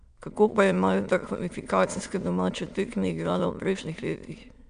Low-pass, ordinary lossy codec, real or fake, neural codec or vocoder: 9.9 kHz; none; fake; autoencoder, 22.05 kHz, a latent of 192 numbers a frame, VITS, trained on many speakers